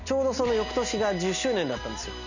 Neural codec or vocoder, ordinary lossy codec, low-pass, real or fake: none; none; 7.2 kHz; real